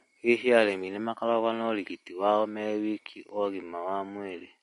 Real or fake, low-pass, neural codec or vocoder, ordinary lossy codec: real; 14.4 kHz; none; MP3, 48 kbps